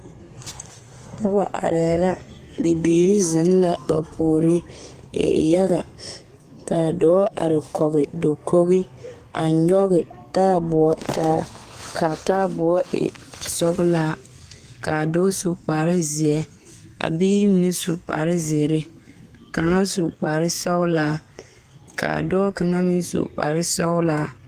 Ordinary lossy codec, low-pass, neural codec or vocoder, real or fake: Opus, 32 kbps; 14.4 kHz; codec, 32 kHz, 1.9 kbps, SNAC; fake